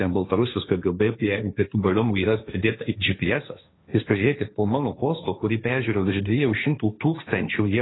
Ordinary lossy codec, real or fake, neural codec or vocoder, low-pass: AAC, 16 kbps; fake; codec, 16 kHz, 1.1 kbps, Voila-Tokenizer; 7.2 kHz